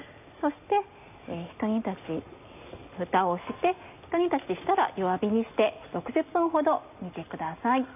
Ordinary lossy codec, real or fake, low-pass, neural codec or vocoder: AAC, 32 kbps; real; 3.6 kHz; none